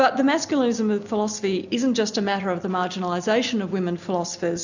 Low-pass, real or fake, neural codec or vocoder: 7.2 kHz; real; none